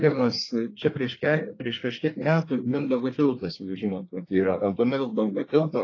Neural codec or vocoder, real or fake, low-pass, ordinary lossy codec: codec, 24 kHz, 1 kbps, SNAC; fake; 7.2 kHz; AAC, 32 kbps